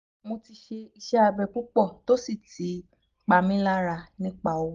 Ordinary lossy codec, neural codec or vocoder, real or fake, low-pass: Opus, 32 kbps; none; real; 7.2 kHz